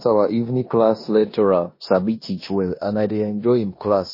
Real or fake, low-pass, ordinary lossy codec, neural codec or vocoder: fake; 5.4 kHz; MP3, 24 kbps; codec, 16 kHz in and 24 kHz out, 0.9 kbps, LongCat-Audio-Codec, four codebook decoder